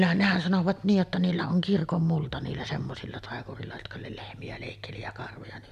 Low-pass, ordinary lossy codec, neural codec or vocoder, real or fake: 14.4 kHz; none; none; real